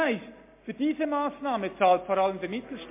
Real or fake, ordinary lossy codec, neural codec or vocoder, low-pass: real; MP3, 24 kbps; none; 3.6 kHz